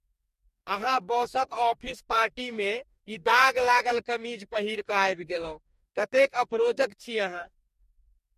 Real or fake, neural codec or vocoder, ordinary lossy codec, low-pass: fake; codec, 44.1 kHz, 2.6 kbps, DAC; MP3, 64 kbps; 14.4 kHz